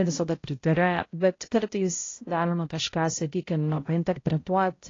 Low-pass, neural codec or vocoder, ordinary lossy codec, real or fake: 7.2 kHz; codec, 16 kHz, 0.5 kbps, X-Codec, HuBERT features, trained on balanced general audio; AAC, 32 kbps; fake